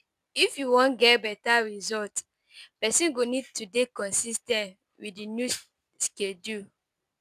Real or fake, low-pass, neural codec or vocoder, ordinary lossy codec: real; 14.4 kHz; none; none